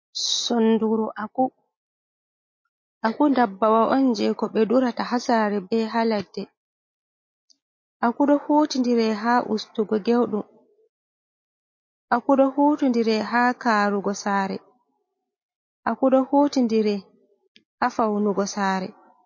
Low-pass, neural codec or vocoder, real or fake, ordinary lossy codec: 7.2 kHz; none; real; MP3, 32 kbps